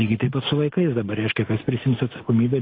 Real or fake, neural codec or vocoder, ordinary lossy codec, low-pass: real; none; AAC, 24 kbps; 5.4 kHz